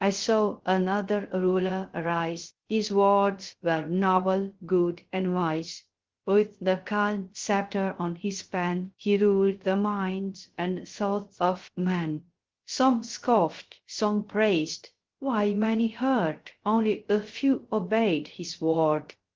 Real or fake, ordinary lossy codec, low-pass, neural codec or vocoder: fake; Opus, 16 kbps; 7.2 kHz; codec, 16 kHz, 0.3 kbps, FocalCodec